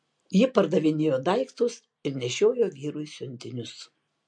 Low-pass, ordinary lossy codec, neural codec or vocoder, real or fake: 9.9 kHz; MP3, 48 kbps; none; real